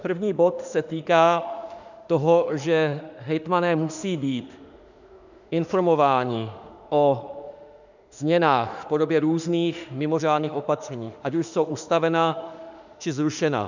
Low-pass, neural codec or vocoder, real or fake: 7.2 kHz; autoencoder, 48 kHz, 32 numbers a frame, DAC-VAE, trained on Japanese speech; fake